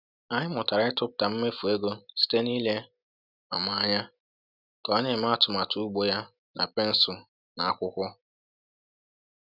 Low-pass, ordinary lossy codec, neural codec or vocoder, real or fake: 5.4 kHz; none; none; real